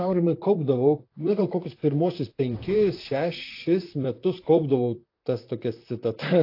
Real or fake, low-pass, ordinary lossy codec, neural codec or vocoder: fake; 5.4 kHz; AAC, 32 kbps; vocoder, 44.1 kHz, 128 mel bands, Pupu-Vocoder